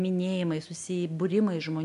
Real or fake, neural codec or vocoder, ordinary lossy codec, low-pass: real; none; AAC, 64 kbps; 10.8 kHz